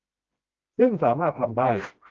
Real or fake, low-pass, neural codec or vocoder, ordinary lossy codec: fake; 7.2 kHz; codec, 16 kHz, 1 kbps, FreqCodec, smaller model; Opus, 24 kbps